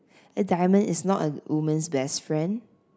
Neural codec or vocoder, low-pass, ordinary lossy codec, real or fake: none; none; none; real